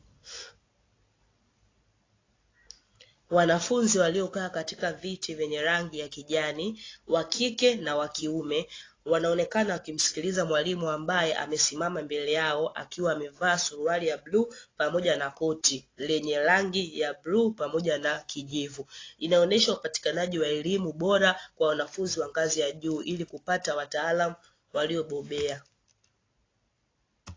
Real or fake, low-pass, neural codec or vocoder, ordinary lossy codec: real; 7.2 kHz; none; AAC, 32 kbps